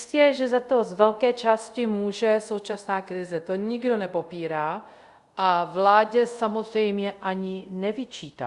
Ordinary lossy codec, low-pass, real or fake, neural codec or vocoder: Opus, 64 kbps; 10.8 kHz; fake; codec, 24 kHz, 0.5 kbps, DualCodec